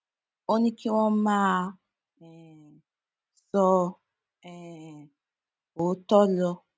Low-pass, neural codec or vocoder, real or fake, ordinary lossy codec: none; none; real; none